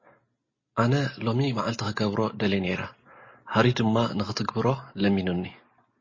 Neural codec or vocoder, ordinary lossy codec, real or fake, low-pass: none; MP3, 32 kbps; real; 7.2 kHz